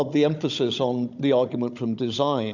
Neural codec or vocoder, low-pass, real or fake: codec, 16 kHz, 16 kbps, FunCodec, trained on Chinese and English, 50 frames a second; 7.2 kHz; fake